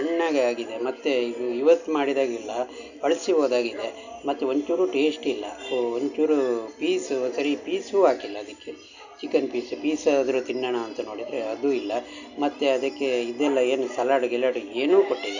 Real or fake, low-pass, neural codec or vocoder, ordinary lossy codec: real; 7.2 kHz; none; none